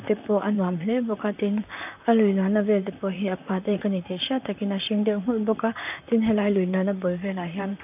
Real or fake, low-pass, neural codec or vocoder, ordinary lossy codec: fake; 3.6 kHz; vocoder, 44.1 kHz, 128 mel bands, Pupu-Vocoder; none